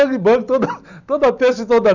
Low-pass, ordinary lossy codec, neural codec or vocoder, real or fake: 7.2 kHz; none; none; real